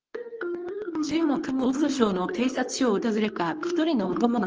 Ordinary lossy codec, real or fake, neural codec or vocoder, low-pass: Opus, 24 kbps; fake; codec, 24 kHz, 0.9 kbps, WavTokenizer, medium speech release version 2; 7.2 kHz